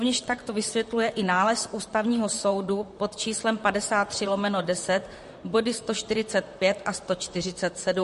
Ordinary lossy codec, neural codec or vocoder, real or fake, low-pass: MP3, 48 kbps; vocoder, 44.1 kHz, 128 mel bands, Pupu-Vocoder; fake; 14.4 kHz